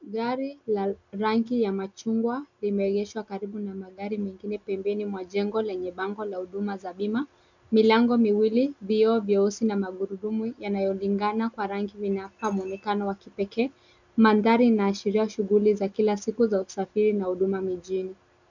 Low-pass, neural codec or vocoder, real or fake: 7.2 kHz; none; real